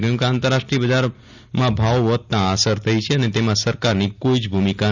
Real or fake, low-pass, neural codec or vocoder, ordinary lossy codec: real; 7.2 kHz; none; none